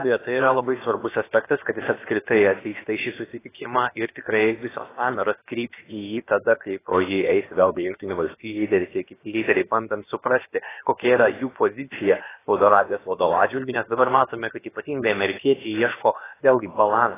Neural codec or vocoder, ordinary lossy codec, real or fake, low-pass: codec, 16 kHz, about 1 kbps, DyCAST, with the encoder's durations; AAC, 16 kbps; fake; 3.6 kHz